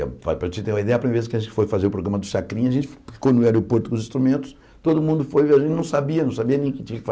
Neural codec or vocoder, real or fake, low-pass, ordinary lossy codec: none; real; none; none